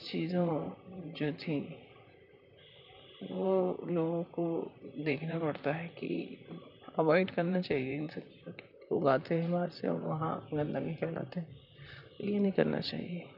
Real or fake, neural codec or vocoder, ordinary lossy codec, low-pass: fake; vocoder, 44.1 kHz, 128 mel bands, Pupu-Vocoder; none; 5.4 kHz